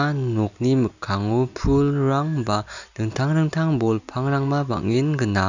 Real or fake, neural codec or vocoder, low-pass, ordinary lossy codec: real; none; 7.2 kHz; none